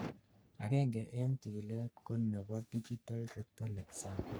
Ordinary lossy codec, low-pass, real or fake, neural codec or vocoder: none; none; fake; codec, 44.1 kHz, 3.4 kbps, Pupu-Codec